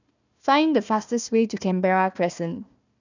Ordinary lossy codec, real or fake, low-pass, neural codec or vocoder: none; fake; 7.2 kHz; codec, 16 kHz, 1 kbps, FunCodec, trained on Chinese and English, 50 frames a second